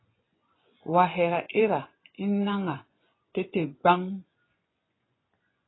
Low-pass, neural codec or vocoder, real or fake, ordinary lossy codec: 7.2 kHz; vocoder, 24 kHz, 100 mel bands, Vocos; fake; AAC, 16 kbps